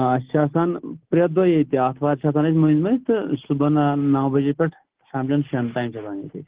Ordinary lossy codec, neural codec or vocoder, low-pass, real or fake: Opus, 16 kbps; none; 3.6 kHz; real